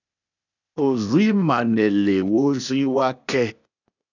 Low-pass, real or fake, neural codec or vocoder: 7.2 kHz; fake; codec, 16 kHz, 0.8 kbps, ZipCodec